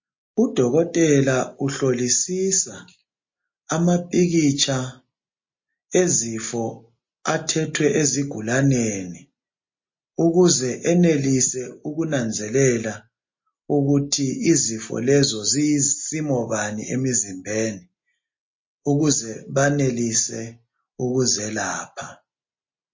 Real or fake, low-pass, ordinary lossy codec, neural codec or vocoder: real; 7.2 kHz; MP3, 32 kbps; none